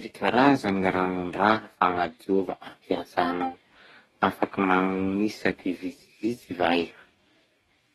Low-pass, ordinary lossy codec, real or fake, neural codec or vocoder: 19.8 kHz; AAC, 32 kbps; fake; codec, 44.1 kHz, 2.6 kbps, DAC